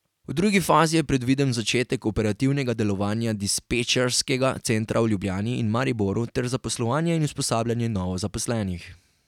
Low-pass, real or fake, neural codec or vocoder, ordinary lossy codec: 19.8 kHz; real; none; none